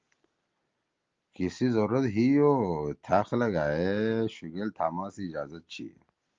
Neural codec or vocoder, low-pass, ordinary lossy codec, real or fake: none; 7.2 kHz; Opus, 32 kbps; real